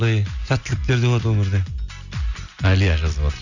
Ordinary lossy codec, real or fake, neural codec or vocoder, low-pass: none; real; none; 7.2 kHz